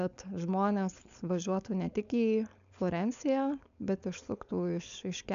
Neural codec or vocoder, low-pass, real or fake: codec, 16 kHz, 4.8 kbps, FACodec; 7.2 kHz; fake